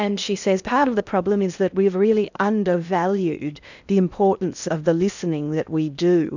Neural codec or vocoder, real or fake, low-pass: codec, 16 kHz in and 24 kHz out, 0.6 kbps, FocalCodec, streaming, 2048 codes; fake; 7.2 kHz